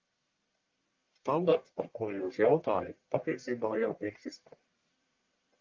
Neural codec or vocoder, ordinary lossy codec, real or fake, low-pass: codec, 44.1 kHz, 1.7 kbps, Pupu-Codec; Opus, 24 kbps; fake; 7.2 kHz